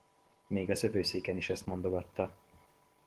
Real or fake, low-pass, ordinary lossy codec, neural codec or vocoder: fake; 14.4 kHz; Opus, 16 kbps; codec, 44.1 kHz, 7.8 kbps, DAC